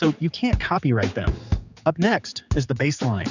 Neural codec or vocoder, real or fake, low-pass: codec, 16 kHz, 4 kbps, X-Codec, HuBERT features, trained on general audio; fake; 7.2 kHz